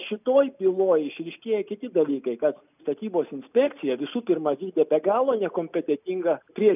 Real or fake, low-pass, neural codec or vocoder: real; 3.6 kHz; none